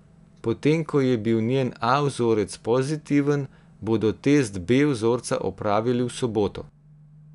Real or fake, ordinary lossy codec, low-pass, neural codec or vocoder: real; none; 10.8 kHz; none